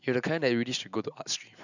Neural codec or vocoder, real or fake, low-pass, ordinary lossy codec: none; real; 7.2 kHz; none